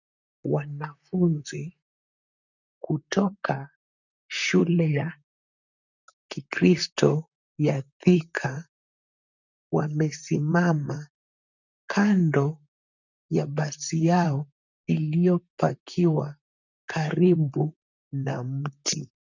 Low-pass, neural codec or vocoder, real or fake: 7.2 kHz; vocoder, 44.1 kHz, 128 mel bands, Pupu-Vocoder; fake